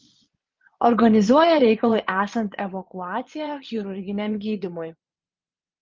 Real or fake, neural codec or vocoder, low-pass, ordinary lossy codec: fake; vocoder, 22.05 kHz, 80 mel bands, Vocos; 7.2 kHz; Opus, 32 kbps